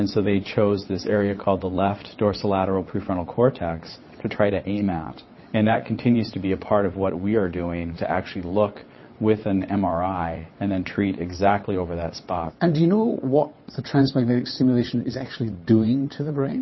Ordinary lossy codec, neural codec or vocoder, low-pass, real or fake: MP3, 24 kbps; vocoder, 22.05 kHz, 80 mel bands, WaveNeXt; 7.2 kHz; fake